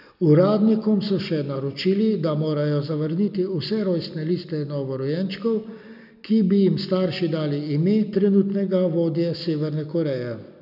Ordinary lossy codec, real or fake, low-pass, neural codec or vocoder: none; real; 5.4 kHz; none